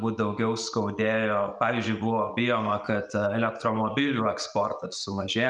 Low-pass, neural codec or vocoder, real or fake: 10.8 kHz; none; real